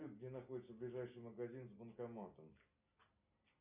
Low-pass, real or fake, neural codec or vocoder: 3.6 kHz; real; none